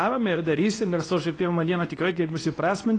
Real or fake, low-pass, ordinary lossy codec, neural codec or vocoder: fake; 10.8 kHz; AAC, 32 kbps; codec, 24 kHz, 0.9 kbps, WavTokenizer, medium speech release version 2